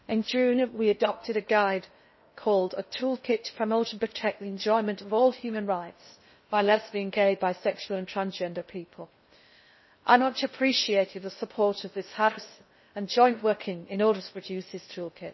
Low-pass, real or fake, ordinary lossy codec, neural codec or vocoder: 7.2 kHz; fake; MP3, 24 kbps; codec, 16 kHz in and 24 kHz out, 0.6 kbps, FocalCodec, streaming, 2048 codes